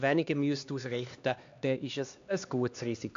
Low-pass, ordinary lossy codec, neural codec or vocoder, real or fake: 7.2 kHz; AAC, 64 kbps; codec, 16 kHz, 2 kbps, X-Codec, HuBERT features, trained on LibriSpeech; fake